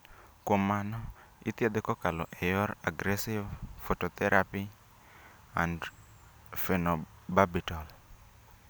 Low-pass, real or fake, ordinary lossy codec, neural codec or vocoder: none; real; none; none